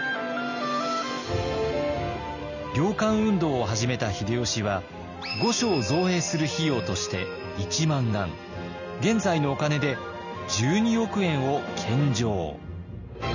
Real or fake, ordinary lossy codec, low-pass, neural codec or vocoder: real; none; 7.2 kHz; none